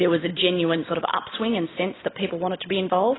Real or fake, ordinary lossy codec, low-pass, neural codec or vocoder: real; AAC, 16 kbps; 7.2 kHz; none